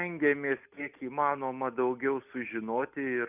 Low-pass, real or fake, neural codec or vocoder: 3.6 kHz; real; none